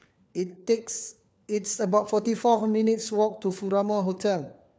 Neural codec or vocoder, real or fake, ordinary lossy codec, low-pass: codec, 16 kHz, 4 kbps, FunCodec, trained on LibriTTS, 50 frames a second; fake; none; none